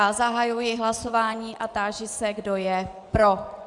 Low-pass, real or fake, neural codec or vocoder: 10.8 kHz; fake; vocoder, 24 kHz, 100 mel bands, Vocos